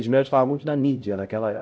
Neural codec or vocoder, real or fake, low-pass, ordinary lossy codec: codec, 16 kHz, 0.5 kbps, X-Codec, HuBERT features, trained on LibriSpeech; fake; none; none